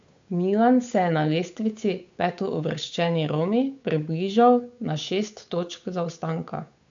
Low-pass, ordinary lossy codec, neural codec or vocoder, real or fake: 7.2 kHz; MP3, 64 kbps; codec, 16 kHz, 8 kbps, FunCodec, trained on Chinese and English, 25 frames a second; fake